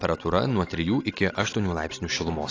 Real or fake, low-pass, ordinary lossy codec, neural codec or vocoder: real; 7.2 kHz; AAC, 32 kbps; none